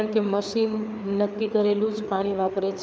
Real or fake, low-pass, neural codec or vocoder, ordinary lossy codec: fake; none; codec, 16 kHz, 4 kbps, FreqCodec, larger model; none